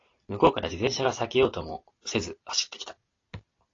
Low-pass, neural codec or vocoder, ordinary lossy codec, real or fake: 7.2 kHz; none; AAC, 32 kbps; real